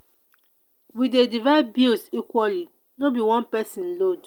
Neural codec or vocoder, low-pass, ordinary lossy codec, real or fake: none; 19.8 kHz; Opus, 32 kbps; real